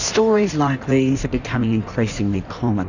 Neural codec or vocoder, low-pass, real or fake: codec, 16 kHz in and 24 kHz out, 1.1 kbps, FireRedTTS-2 codec; 7.2 kHz; fake